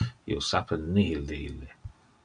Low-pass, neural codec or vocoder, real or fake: 9.9 kHz; none; real